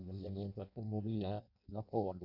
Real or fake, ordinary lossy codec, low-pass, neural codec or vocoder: fake; none; 5.4 kHz; codec, 16 kHz in and 24 kHz out, 0.6 kbps, FireRedTTS-2 codec